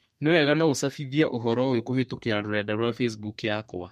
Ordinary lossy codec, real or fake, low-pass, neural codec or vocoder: MP3, 64 kbps; fake; 14.4 kHz; codec, 32 kHz, 1.9 kbps, SNAC